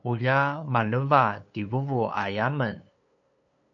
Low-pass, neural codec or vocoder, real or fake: 7.2 kHz; codec, 16 kHz, 2 kbps, FunCodec, trained on LibriTTS, 25 frames a second; fake